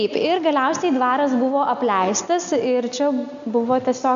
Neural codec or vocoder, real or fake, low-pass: none; real; 7.2 kHz